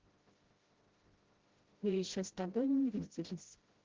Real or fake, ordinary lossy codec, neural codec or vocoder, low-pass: fake; Opus, 16 kbps; codec, 16 kHz, 0.5 kbps, FreqCodec, smaller model; 7.2 kHz